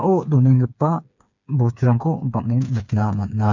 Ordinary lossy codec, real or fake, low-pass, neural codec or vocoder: none; fake; 7.2 kHz; codec, 16 kHz, 4 kbps, FreqCodec, smaller model